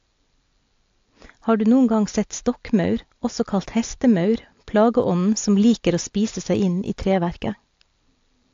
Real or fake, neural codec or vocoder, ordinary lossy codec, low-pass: real; none; AAC, 48 kbps; 7.2 kHz